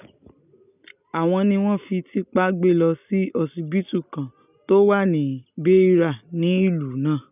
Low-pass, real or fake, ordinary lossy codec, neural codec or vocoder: 3.6 kHz; real; none; none